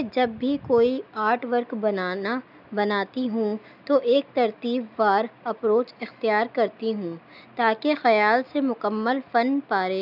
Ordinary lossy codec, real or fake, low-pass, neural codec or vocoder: none; real; 5.4 kHz; none